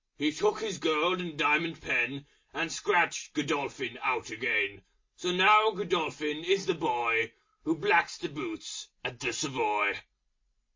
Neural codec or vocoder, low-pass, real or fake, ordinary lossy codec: none; 7.2 kHz; real; MP3, 32 kbps